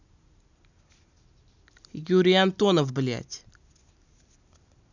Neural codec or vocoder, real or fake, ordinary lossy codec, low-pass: none; real; none; 7.2 kHz